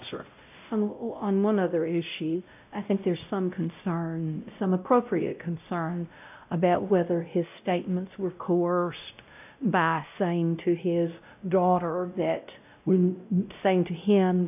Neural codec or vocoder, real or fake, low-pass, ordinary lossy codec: codec, 16 kHz, 0.5 kbps, X-Codec, WavLM features, trained on Multilingual LibriSpeech; fake; 3.6 kHz; AAC, 32 kbps